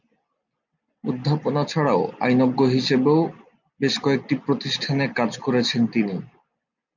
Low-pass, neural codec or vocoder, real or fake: 7.2 kHz; none; real